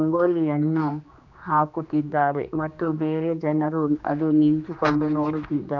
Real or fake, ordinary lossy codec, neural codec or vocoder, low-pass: fake; none; codec, 16 kHz, 2 kbps, X-Codec, HuBERT features, trained on general audio; 7.2 kHz